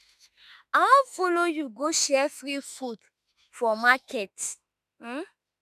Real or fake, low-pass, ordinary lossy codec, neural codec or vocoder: fake; 14.4 kHz; none; autoencoder, 48 kHz, 32 numbers a frame, DAC-VAE, trained on Japanese speech